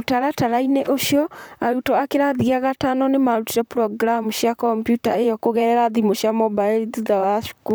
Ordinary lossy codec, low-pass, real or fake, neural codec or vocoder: none; none; fake; vocoder, 44.1 kHz, 128 mel bands, Pupu-Vocoder